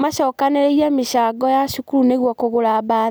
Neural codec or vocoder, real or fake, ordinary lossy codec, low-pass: none; real; none; none